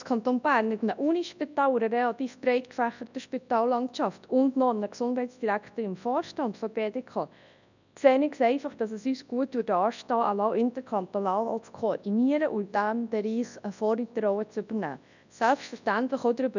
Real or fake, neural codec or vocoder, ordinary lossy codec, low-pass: fake; codec, 24 kHz, 0.9 kbps, WavTokenizer, large speech release; none; 7.2 kHz